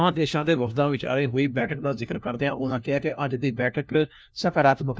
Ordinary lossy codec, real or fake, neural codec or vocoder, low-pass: none; fake; codec, 16 kHz, 1 kbps, FunCodec, trained on LibriTTS, 50 frames a second; none